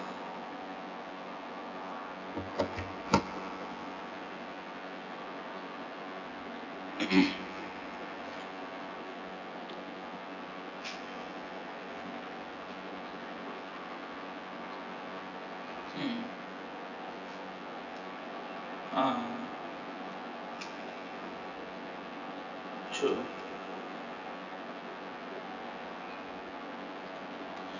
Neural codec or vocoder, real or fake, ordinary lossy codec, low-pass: vocoder, 24 kHz, 100 mel bands, Vocos; fake; none; 7.2 kHz